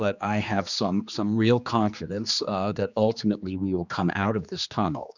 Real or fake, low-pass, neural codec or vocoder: fake; 7.2 kHz; codec, 16 kHz, 2 kbps, X-Codec, HuBERT features, trained on general audio